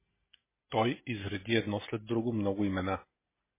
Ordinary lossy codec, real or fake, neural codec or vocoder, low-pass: MP3, 16 kbps; real; none; 3.6 kHz